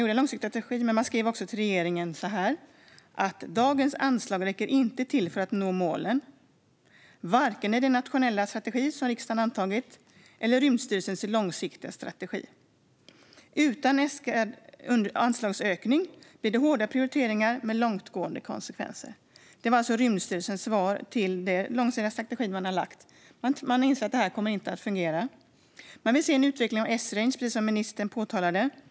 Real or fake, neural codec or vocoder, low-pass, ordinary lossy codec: real; none; none; none